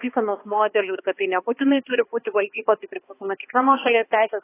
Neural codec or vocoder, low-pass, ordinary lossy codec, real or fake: codec, 16 kHz, 2 kbps, X-Codec, HuBERT features, trained on balanced general audio; 3.6 kHz; AAC, 16 kbps; fake